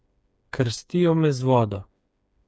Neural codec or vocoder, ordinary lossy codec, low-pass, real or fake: codec, 16 kHz, 4 kbps, FreqCodec, smaller model; none; none; fake